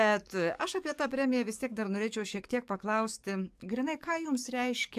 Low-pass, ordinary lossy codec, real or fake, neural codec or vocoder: 14.4 kHz; AAC, 96 kbps; fake; codec, 44.1 kHz, 7.8 kbps, DAC